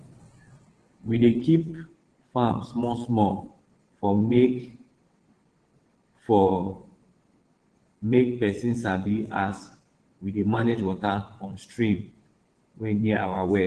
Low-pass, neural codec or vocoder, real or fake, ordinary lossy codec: 9.9 kHz; vocoder, 22.05 kHz, 80 mel bands, WaveNeXt; fake; Opus, 16 kbps